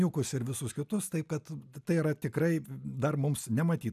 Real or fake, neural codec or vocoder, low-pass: real; none; 14.4 kHz